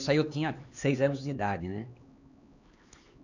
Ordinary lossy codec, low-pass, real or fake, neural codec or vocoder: none; 7.2 kHz; fake; codec, 16 kHz, 4 kbps, X-Codec, HuBERT features, trained on LibriSpeech